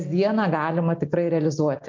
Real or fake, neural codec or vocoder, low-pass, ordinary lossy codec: real; none; 7.2 kHz; MP3, 64 kbps